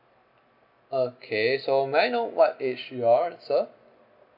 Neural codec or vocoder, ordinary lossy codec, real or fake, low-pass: none; none; real; 5.4 kHz